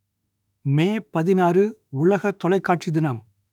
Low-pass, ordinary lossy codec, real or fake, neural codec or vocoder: 19.8 kHz; none; fake; autoencoder, 48 kHz, 32 numbers a frame, DAC-VAE, trained on Japanese speech